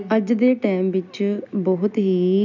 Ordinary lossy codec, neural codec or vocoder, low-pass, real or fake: none; none; 7.2 kHz; real